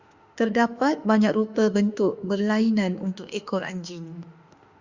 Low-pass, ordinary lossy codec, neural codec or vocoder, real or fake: 7.2 kHz; Opus, 64 kbps; autoencoder, 48 kHz, 32 numbers a frame, DAC-VAE, trained on Japanese speech; fake